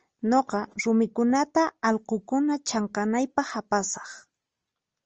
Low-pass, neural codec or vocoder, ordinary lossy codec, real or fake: 7.2 kHz; none; Opus, 24 kbps; real